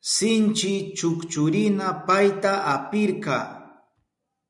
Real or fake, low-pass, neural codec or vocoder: real; 10.8 kHz; none